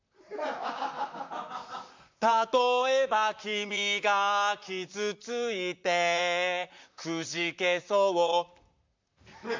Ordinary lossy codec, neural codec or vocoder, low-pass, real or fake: MP3, 64 kbps; vocoder, 44.1 kHz, 128 mel bands, Pupu-Vocoder; 7.2 kHz; fake